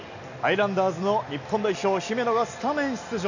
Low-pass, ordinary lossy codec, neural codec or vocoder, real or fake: 7.2 kHz; none; none; real